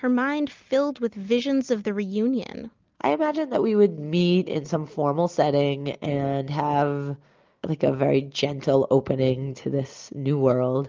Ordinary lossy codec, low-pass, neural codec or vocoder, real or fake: Opus, 24 kbps; 7.2 kHz; none; real